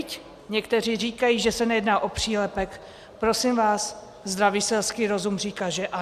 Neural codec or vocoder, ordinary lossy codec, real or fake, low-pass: none; Opus, 64 kbps; real; 14.4 kHz